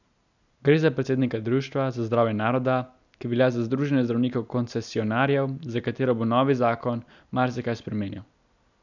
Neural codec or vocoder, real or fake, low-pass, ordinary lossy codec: none; real; 7.2 kHz; none